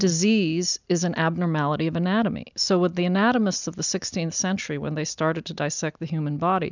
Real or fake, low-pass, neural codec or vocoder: real; 7.2 kHz; none